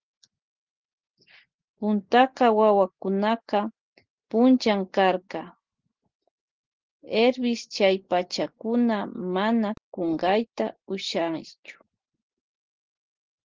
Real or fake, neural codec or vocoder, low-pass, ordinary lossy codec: real; none; 7.2 kHz; Opus, 16 kbps